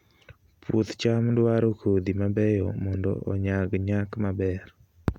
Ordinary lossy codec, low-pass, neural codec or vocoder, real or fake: none; 19.8 kHz; none; real